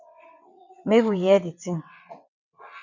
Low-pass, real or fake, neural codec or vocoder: 7.2 kHz; fake; vocoder, 22.05 kHz, 80 mel bands, WaveNeXt